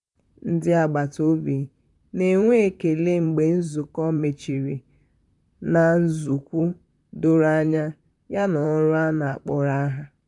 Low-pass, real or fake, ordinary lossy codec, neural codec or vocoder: 10.8 kHz; fake; none; vocoder, 44.1 kHz, 128 mel bands, Pupu-Vocoder